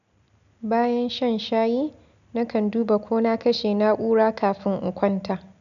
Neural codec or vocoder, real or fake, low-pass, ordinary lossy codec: none; real; 7.2 kHz; none